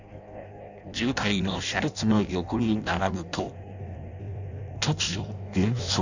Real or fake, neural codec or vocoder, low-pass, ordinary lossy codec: fake; codec, 16 kHz in and 24 kHz out, 0.6 kbps, FireRedTTS-2 codec; 7.2 kHz; none